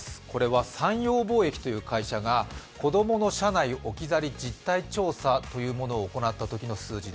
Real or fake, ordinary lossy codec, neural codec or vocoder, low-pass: real; none; none; none